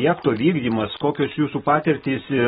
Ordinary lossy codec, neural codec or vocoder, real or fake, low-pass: AAC, 16 kbps; none; real; 10.8 kHz